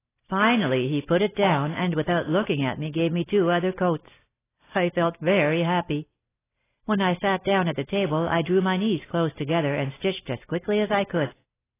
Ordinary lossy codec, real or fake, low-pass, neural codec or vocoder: AAC, 16 kbps; real; 3.6 kHz; none